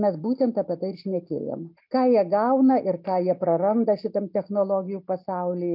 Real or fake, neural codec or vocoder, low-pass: real; none; 5.4 kHz